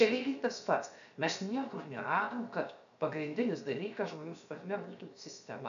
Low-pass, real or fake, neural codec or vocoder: 7.2 kHz; fake; codec, 16 kHz, 0.7 kbps, FocalCodec